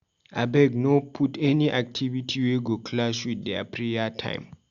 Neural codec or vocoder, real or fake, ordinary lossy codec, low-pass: none; real; Opus, 64 kbps; 7.2 kHz